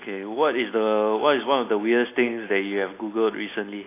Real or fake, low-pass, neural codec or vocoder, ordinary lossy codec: real; 3.6 kHz; none; AAC, 24 kbps